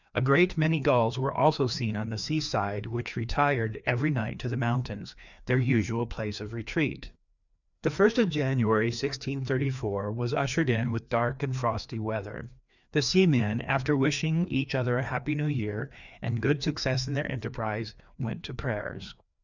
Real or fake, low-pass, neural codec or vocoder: fake; 7.2 kHz; codec, 16 kHz, 2 kbps, FreqCodec, larger model